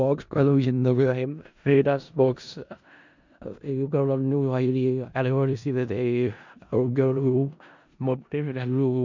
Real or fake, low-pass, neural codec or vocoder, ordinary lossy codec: fake; 7.2 kHz; codec, 16 kHz in and 24 kHz out, 0.4 kbps, LongCat-Audio-Codec, four codebook decoder; MP3, 64 kbps